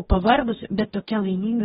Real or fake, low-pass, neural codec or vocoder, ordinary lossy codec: fake; 19.8 kHz; codec, 44.1 kHz, 2.6 kbps, DAC; AAC, 16 kbps